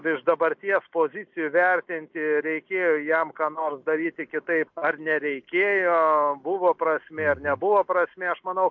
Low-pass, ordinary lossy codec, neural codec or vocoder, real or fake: 7.2 kHz; MP3, 48 kbps; none; real